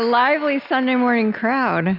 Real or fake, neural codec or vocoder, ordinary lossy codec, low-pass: real; none; Opus, 64 kbps; 5.4 kHz